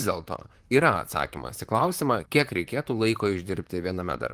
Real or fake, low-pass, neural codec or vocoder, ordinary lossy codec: fake; 14.4 kHz; vocoder, 44.1 kHz, 128 mel bands, Pupu-Vocoder; Opus, 24 kbps